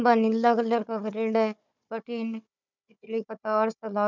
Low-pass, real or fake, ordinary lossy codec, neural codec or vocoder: 7.2 kHz; fake; none; codec, 16 kHz, 4 kbps, FunCodec, trained on Chinese and English, 50 frames a second